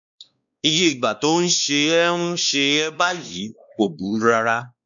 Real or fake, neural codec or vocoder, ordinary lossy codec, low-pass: fake; codec, 16 kHz, 2 kbps, X-Codec, WavLM features, trained on Multilingual LibriSpeech; none; 7.2 kHz